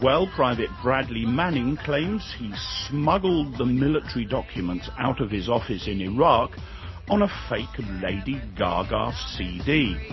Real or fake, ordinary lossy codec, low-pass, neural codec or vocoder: real; MP3, 24 kbps; 7.2 kHz; none